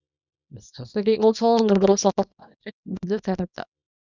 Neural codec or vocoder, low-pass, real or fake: codec, 24 kHz, 0.9 kbps, WavTokenizer, small release; 7.2 kHz; fake